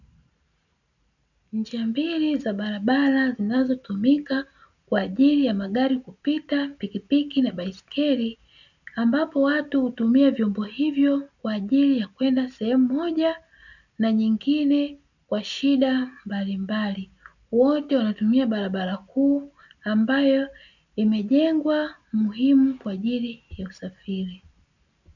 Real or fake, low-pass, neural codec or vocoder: real; 7.2 kHz; none